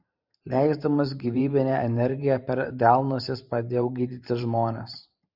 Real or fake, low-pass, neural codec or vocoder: fake; 5.4 kHz; vocoder, 44.1 kHz, 128 mel bands every 512 samples, BigVGAN v2